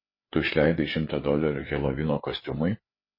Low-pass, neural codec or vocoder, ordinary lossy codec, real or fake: 5.4 kHz; codec, 16 kHz, 4 kbps, FreqCodec, larger model; MP3, 24 kbps; fake